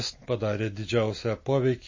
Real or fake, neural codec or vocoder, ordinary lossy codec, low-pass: real; none; MP3, 32 kbps; 7.2 kHz